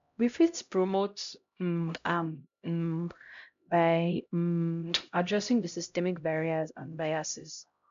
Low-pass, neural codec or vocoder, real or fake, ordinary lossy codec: 7.2 kHz; codec, 16 kHz, 0.5 kbps, X-Codec, HuBERT features, trained on LibriSpeech; fake; MP3, 64 kbps